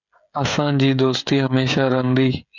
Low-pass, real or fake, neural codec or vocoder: 7.2 kHz; fake; codec, 16 kHz, 16 kbps, FreqCodec, smaller model